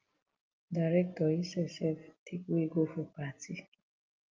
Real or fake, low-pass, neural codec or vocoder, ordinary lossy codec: real; 7.2 kHz; none; Opus, 32 kbps